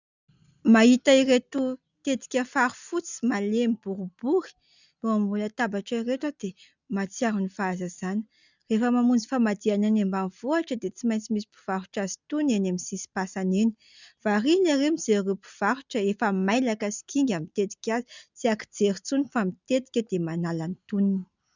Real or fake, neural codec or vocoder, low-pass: real; none; 7.2 kHz